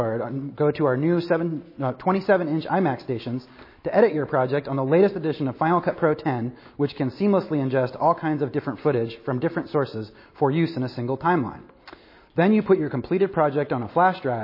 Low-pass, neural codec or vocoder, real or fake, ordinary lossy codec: 5.4 kHz; none; real; MP3, 24 kbps